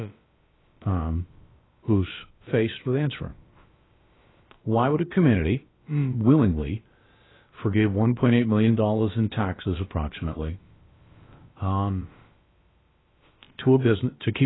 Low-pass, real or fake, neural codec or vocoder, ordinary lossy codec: 7.2 kHz; fake; codec, 16 kHz, about 1 kbps, DyCAST, with the encoder's durations; AAC, 16 kbps